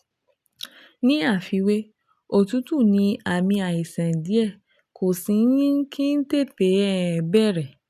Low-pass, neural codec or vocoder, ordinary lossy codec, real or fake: 14.4 kHz; none; none; real